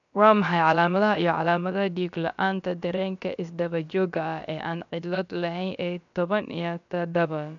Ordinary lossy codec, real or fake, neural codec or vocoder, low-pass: none; fake; codec, 16 kHz, about 1 kbps, DyCAST, with the encoder's durations; 7.2 kHz